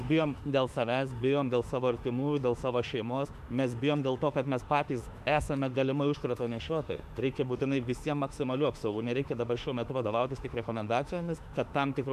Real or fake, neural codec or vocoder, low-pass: fake; autoencoder, 48 kHz, 32 numbers a frame, DAC-VAE, trained on Japanese speech; 14.4 kHz